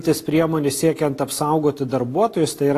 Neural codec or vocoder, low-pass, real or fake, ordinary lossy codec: none; 14.4 kHz; real; AAC, 48 kbps